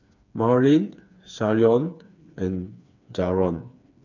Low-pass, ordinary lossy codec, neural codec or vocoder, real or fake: 7.2 kHz; none; codec, 16 kHz, 4 kbps, FreqCodec, smaller model; fake